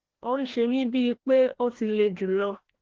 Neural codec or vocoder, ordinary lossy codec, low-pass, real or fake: codec, 16 kHz, 1 kbps, FreqCodec, larger model; Opus, 16 kbps; 7.2 kHz; fake